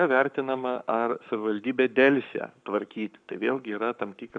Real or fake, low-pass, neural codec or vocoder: fake; 9.9 kHz; codec, 44.1 kHz, 7.8 kbps, Pupu-Codec